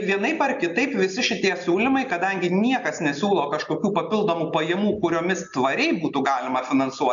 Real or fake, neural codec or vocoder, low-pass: real; none; 7.2 kHz